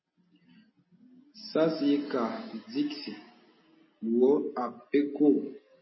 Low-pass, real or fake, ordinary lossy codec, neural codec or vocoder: 7.2 kHz; real; MP3, 24 kbps; none